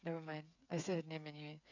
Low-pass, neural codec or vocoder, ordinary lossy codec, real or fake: 7.2 kHz; codec, 16 kHz, 8 kbps, FreqCodec, smaller model; none; fake